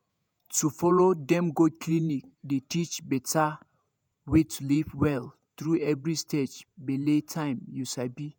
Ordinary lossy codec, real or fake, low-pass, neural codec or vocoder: none; fake; none; vocoder, 48 kHz, 128 mel bands, Vocos